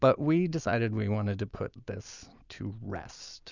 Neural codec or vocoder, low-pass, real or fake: none; 7.2 kHz; real